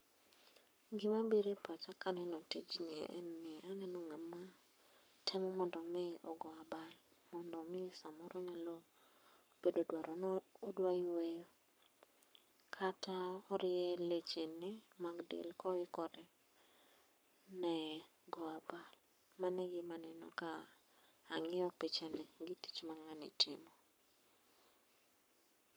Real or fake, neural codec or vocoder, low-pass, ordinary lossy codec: fake; codec, 44.1 kHz, 7.8 kbps, Pupu-Codec; none; none